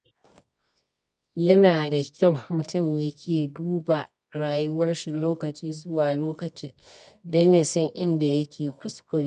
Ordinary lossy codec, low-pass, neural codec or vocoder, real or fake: none; 10.8 kHz; codec, 24 kHz, 0.9 kbps, WavTokenizer, medium music audio release; fake